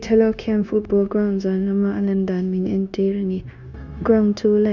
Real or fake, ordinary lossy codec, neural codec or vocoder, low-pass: fake; none; codec, 16 kHz, 0.9 kbps, LongCat-Audio-Codec; 7.2 kHz